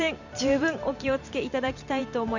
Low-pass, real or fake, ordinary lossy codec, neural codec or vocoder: 7.2 kHz; fake; none; vocoder, 44.1 kHz, 128 mel bands every 512 samples, BigVGAN v2